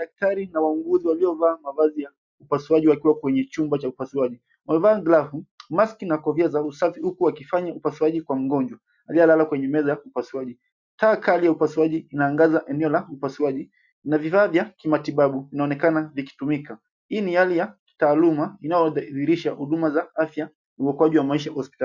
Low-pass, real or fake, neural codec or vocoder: 7.2 kHz; real; none